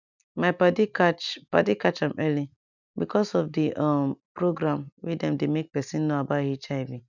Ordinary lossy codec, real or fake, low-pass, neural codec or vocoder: none; real; 7.2 kHz; none